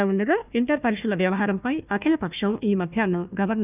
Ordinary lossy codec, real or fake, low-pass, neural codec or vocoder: none; fake; 3.6 kHz; codec, 16 kHz, 1 kbps, FunCodec, trained on Chinese and English, 50 frames a second